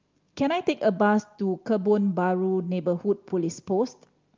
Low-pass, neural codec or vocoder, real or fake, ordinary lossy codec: 7.2 kHz; none; real; Opus, 24 kbps